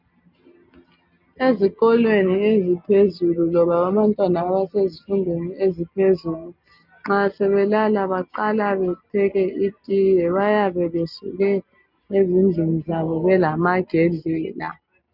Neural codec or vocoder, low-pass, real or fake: none; 5.4 kHz; real